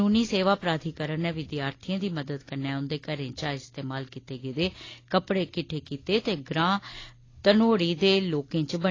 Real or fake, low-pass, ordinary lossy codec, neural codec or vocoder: real; 7.2 kHz; AAC, 32 kbps; none